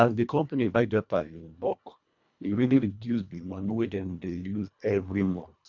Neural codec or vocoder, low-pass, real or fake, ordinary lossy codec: codec, 24 kHz, 1.5 kbps, HILCodec; 7.2 kHz; fake; none